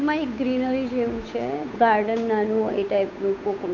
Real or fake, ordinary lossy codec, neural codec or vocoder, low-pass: fake; none; codec, 16 kHz, 8 kbps, FunCodec, trained on Chinese and English, 25 frames a second; 7.2 kHz